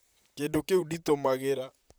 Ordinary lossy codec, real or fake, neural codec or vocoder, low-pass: none; fake; vocoder, 44.1 kHz, 128 mel bands, Pupu-Vocoder; none